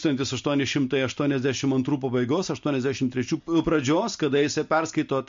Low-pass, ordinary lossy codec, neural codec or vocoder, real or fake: 7.2 kHz; MP3, 48 kbps; none; real